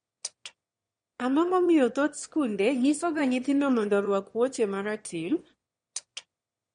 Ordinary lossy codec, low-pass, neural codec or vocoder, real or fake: MP3, 48 kbps; 9.9 kHz; autoencoder, 22.05 kHz, a latent of 192 numbers a frame, VITS, trained on one speaker; fake